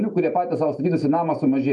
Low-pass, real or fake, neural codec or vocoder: 10.8 kHz; real; none